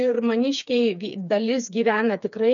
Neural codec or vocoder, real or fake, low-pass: codec, 16 kHz, 4 kbps, FreqCodec, smaller model; fake; 7.2 kHz